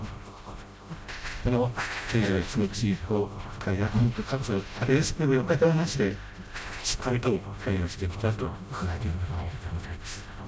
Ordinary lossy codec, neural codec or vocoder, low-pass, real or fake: none; codec, 16 kHz, 0.5 kbps, FreqCodec, smaller model; none; fake